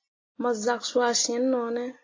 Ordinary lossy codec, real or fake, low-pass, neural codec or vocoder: AAC, 32 kbps; real; 7.2 kHz; none